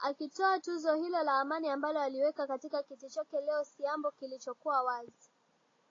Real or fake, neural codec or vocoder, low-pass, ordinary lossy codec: real; none; 7.2 kHz; AAC, 32 kbps